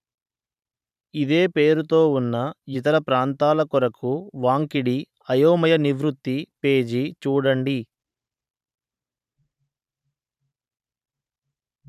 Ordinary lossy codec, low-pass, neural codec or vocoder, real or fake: none; 14.4 kHz; vocoder, 44.1 kHz, 128 mel bands every 512 samples, BigVGAN v2; fake